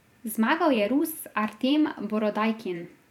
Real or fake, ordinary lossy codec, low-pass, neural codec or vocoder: fake; none; 19.8 kHz; vocoder, 44.1 kHz, 128 mel bands every 512 samples, BigVGAN v2